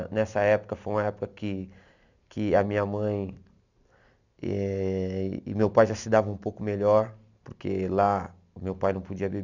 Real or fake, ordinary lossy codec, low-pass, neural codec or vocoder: real; none; 7.2 kHz; none